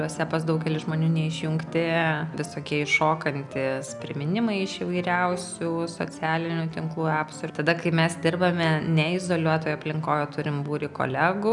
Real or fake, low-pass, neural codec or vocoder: real; 10.8 kHz; none